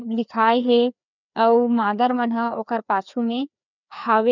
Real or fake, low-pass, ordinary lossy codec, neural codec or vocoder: fake; 7.2 kHz; none; codec, 16 kHz, 2 kbps, FreqCodec, larger model